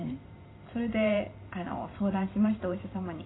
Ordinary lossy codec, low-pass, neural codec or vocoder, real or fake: AAC, 16 kbps; 7.2 kHz; vocoder, 44.1 kHz, 128 mel bands every 512 samples, BigVGAN v2; fake